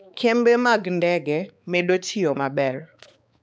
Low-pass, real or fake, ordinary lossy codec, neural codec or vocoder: none; fake; none; codec, 16 kHz, 4 kbps, X-Codec, HuBERT features, trained on balanced general audio